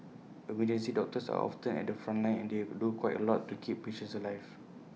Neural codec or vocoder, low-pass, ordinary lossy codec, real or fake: none; none; none; real